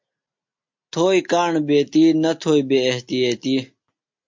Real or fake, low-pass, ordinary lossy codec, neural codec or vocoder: real; 7.2 kHz; MP3, 48 kbps; none